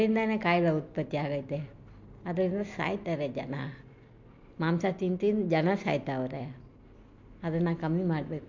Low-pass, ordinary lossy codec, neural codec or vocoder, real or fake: 7.2 kHz; MP3, 48 kbps; none; real